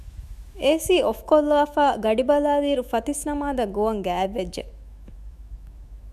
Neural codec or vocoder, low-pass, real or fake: autoencoder, 48 kHz, 128 numbers a frame, DAC-VAE, trained on Japanese speech; 14.4 kHz; fake